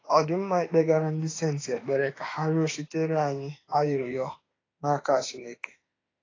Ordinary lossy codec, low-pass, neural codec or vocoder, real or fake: AAC, 32 kbps; 7.2 kHz; autoencoder, 48 kHz, 32 numbers a frame, DAC-VAE, trained on Japanese speech; fake